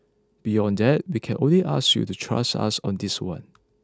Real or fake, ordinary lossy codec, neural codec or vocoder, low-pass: real; none; none; none